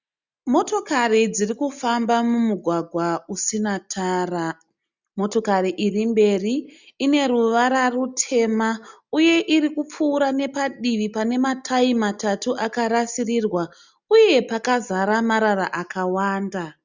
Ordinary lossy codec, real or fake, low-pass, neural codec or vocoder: Opus, 64 kbps; real; 7.2 kHz; none